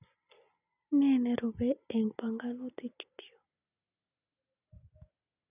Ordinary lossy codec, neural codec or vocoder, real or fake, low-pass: none; none; real; 3.6 kHz